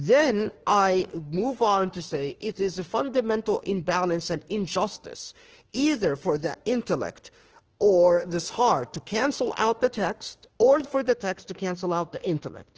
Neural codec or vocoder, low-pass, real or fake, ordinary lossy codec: autoencoder, 48 kHz, 32 numbers a frame, DAC-VAE, trained on Japanese speech; 7.2 kHz; fake; Opus, 16 kbps